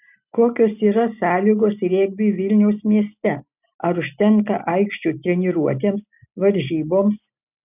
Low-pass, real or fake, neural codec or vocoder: 3.6 kHz; real; none